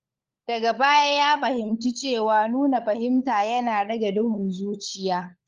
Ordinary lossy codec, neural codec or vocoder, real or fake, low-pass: Opus, 32 kbps; codec, 16 kHz, 16 kbps, FunCodec, trained on LibriTTS, 50 frames a second; fake; 7.2 kHz